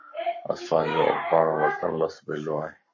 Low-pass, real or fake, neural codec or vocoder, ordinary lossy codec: 7.2 kHz; fake; codec, 44.1 kHz, 7.8 kbps, Pupu-Codec; MP3, 32 kbps